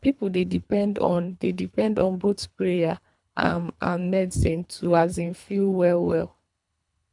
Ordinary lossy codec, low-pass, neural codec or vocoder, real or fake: none; 10.8 kHz; codec, 24 kHz, 3 kbps, HILCodec; fake